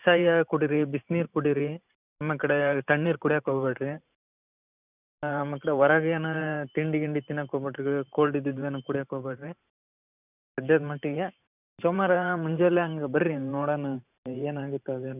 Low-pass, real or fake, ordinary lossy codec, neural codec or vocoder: 3.6 kHz; fake; none; vocoder, 44.1 kHz, 128 mel bands every 512 samples, BigVGAN v2